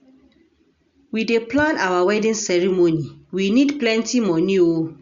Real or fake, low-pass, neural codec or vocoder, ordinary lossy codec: real; 7.2 kHz; none; Opus, 64 kbps